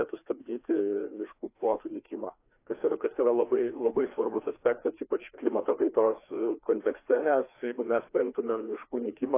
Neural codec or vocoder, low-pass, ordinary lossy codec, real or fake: codec, 16 kHz, 2 kbps, FreqCodec, larger model; 3.6 kHz; AAC, 24 kbps; fake